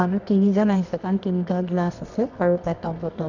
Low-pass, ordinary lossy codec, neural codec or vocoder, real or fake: 7.2 kHz; none; codec, 24 kHz, 0.9 kbps, WavTokenizer, medium music audio release; fake